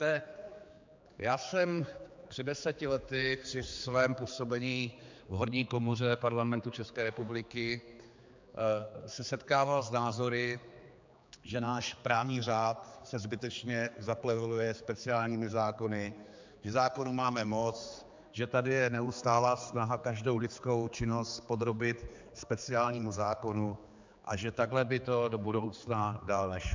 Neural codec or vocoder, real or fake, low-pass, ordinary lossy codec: codec, 16 kHz, 4 kbps, X-Codec, HuBERT features, trained on general audio; fake; 7.2 kHz; MP3, 64 kbps